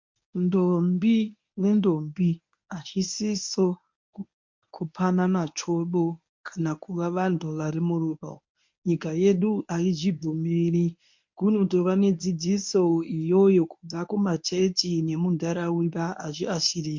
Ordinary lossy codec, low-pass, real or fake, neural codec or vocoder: MP3, 48 kbps; 7.2 kHz; fake; codec, 24 kHz, 0.9 kbps, WavTokenizer, medium speech release version 1